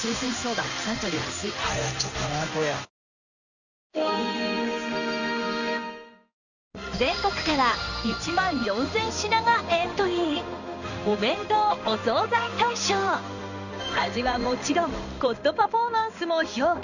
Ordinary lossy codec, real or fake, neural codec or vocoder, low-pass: none; fake; codec, 16 kHz in and 24 kHz out, 1 kbps, XY-Tokenizer; 7.2 kHz